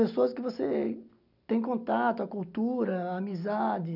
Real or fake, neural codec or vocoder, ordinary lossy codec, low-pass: real; none; none; 5.4 kHz